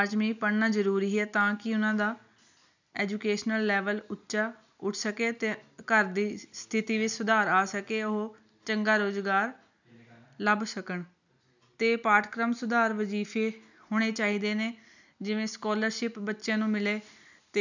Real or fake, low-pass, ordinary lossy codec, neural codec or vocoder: real; 7.2 kHz; none; none